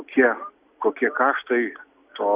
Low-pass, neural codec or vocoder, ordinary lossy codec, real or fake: 3.6 kHz; none; Opus, 64 kbps; real